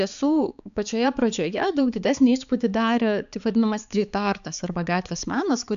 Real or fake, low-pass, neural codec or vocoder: fake; 7.2 kHz; codec, 16 kHz, 4 kbps, X-Codec, HuBERT features, trained on balanced general audio